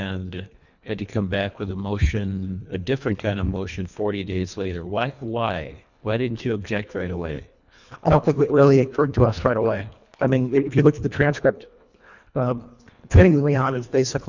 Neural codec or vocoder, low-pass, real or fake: codec, 24 kHz, 1.5 kbps, HILCodec; 7.2 kHz; fake